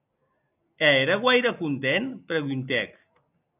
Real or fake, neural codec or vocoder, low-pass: real; none; 3.6 kHz